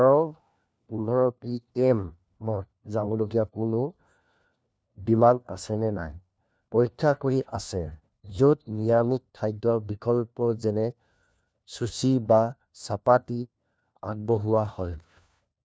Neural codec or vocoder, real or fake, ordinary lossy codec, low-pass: codec, 16 kHz, 1 kbps, FunCodec, trained on LibriTTS, 50 frames a second; fake; none; none